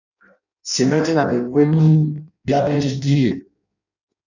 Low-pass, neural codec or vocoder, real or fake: 7.2 kHz; codec, 16 kHz in and 24 kHz out, 0.6 kbps, FireRedTTS-2 codec; fake